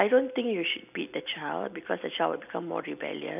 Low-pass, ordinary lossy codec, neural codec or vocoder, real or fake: 3.6 kHz; none; none; real